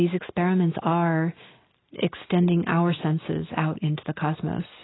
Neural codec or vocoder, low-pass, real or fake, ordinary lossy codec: none; 7.2 kHz; real; AAC, 16 kbps